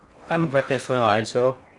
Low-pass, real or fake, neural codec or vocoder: 10.8 kHz; fake; codec, 16 kHz in and 24 kHz out, 0.6 kbps, FocalCodec, streaming, 4096 codes